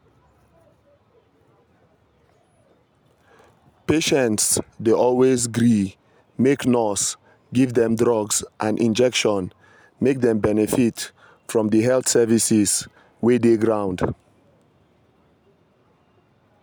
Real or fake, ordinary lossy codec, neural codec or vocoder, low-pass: real; none; none; none